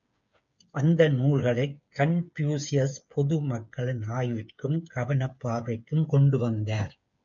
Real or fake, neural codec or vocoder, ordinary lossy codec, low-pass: fake; codec, 16 kHz, 8 kbps, FreqCodec, smaller model; MP3, 64 kbps; 7.2 kHz